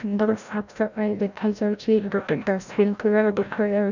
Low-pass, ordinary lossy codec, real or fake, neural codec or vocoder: 7.2 kHz; none; fake; codec, 16 kHz, 0.5 kbps, FreqCodec, larger model